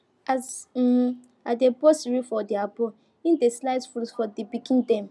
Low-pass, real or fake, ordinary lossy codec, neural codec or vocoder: none; real; none; none